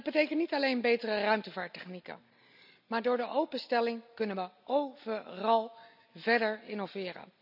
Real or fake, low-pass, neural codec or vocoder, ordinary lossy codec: real; 5.4 kHz; none; none